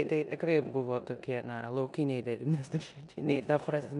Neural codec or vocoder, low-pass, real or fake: codec, 16 kHz in and 24 kHz out, 0.9 kbps, LongCat-Audio-Codec, four codebook decoder; 10.8 kHz; fake